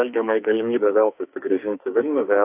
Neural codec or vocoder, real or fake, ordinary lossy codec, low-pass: codec, 44.1 kHz, 2.6 kbps, SNAC; fake; AAC, 32 kbps; 3.6 kHz